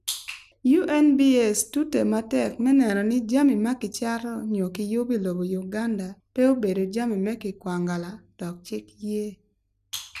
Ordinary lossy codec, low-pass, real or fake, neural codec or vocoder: none; 14.4 kHz; real; none